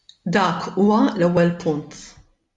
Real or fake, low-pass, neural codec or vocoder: real; 10.8 kHz; none